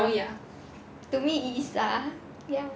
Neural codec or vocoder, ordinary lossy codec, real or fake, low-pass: none; none; real; none